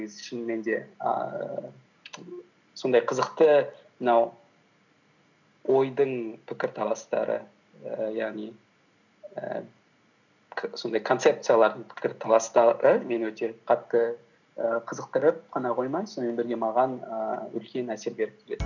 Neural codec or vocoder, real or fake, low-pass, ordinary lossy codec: none; real; 7.2 kHz; none